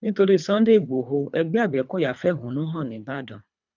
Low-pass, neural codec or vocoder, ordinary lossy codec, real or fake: 7.2 kHz; codec, 24 kHz, 3 kbps, HILCodec; none; fake